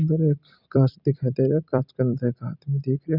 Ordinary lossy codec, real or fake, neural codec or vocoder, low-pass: none; fake; vocoder, 44.1 kHz, 128 mel bands every 256 samples, BigVGAN v2; 5.4 kHz